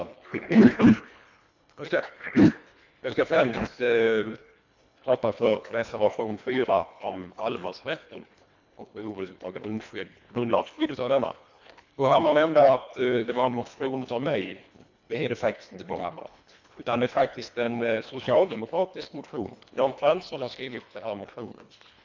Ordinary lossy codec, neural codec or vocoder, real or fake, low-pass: MP3, 64 kbps; codec, 24 kHz, 1.5 kbps, HILCodec; fake; 7.2 kHz